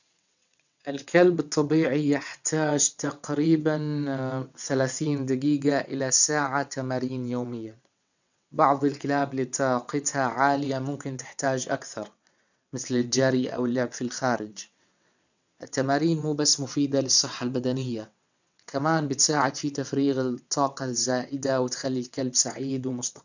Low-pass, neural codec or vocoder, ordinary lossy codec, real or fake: 7.2 kHz; vocoder, 22.05 kHz, 80 mel bands, WaveNeXt; none; fake